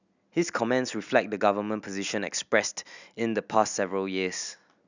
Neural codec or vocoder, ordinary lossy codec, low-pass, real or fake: none; none; 7.2 kHz; real